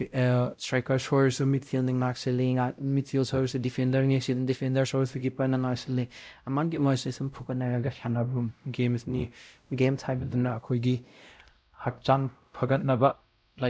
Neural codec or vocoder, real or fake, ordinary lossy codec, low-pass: codec, 16 kHz, 0.5 kbps, X-Codec, WavLM features, trained on Multilingual LibriSpeech; fake; none; none